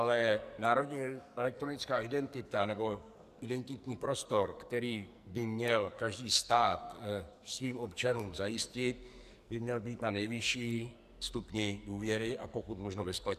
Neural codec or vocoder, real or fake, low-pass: codec, 44.1 kHz, 2.6 kbps, SNAC; fake; 14.4 kHz